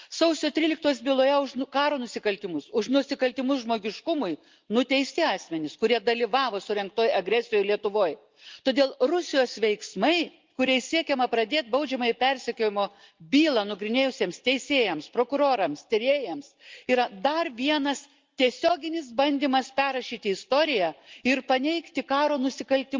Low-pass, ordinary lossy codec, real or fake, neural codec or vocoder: 7.2 kHz; Opus, 32 kbps; real; none